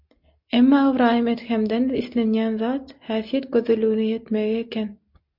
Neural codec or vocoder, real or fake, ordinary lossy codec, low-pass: none; real; MP3, 48 kbps; 5.4 kHz